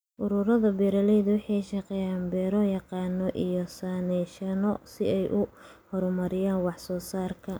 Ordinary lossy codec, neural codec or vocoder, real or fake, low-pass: none; none; real; none